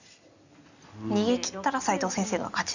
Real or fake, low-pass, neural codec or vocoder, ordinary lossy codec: real; 7.2 kHz; none; none